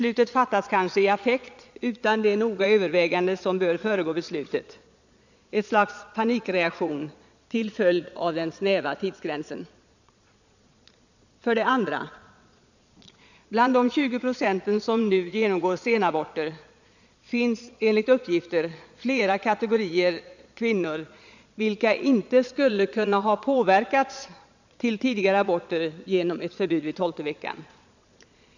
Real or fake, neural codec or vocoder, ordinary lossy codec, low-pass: fake; vocoder, 22.05 kHz, 80 mel bands, Vocos; none; 7.2 kHz